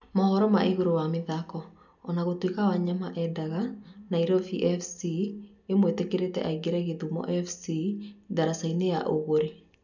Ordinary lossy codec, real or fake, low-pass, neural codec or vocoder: none; real; 7.2 kHz; none